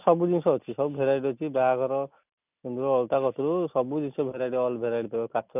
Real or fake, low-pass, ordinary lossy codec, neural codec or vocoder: real; 3.6 kHz; none; none